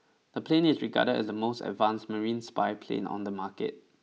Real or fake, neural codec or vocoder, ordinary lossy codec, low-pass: real; none; none; none